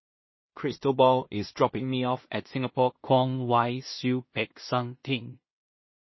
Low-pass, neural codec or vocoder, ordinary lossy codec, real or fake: 7.2 kHz; codec, 16 kHz in and 24 kHz out, 0.4 kbps, LongCat-Audio-Codec, two codebook decoder; MP3, 24 kbps; fake